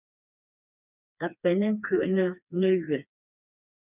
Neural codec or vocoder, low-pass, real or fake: codec, 16 kHz, 2 kbps, FreqCodec, smaller model; 3.6 kHz; fake